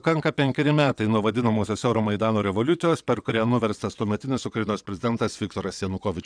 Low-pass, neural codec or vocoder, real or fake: 9.9 kHz; vocoder, 22.05 kHz, 80 mel bands, WaveNeXt; fake